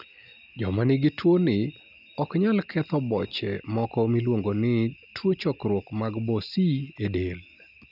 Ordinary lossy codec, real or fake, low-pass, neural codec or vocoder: none; real; 5.4 kHz; none